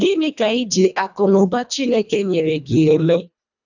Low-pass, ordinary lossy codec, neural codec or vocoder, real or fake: 7.2 kHz; none; codec, 24 kHz, 1.5 kbps, HILCodec; fake